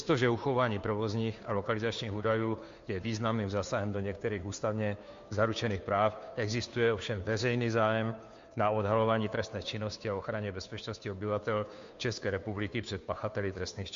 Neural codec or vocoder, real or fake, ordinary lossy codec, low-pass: codec, 16 kHz, 2 kbps, FunCodec, trained on Chinese and English, 25 frames a second; fake; MP3, 48 kbps; 7.2 kHz